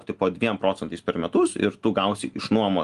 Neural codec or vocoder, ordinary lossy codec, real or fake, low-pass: none; Opus, 24 kbps; real; 10.8 kHz